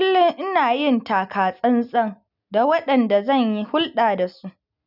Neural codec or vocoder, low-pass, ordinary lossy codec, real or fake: none; 5.4 kHz; none; real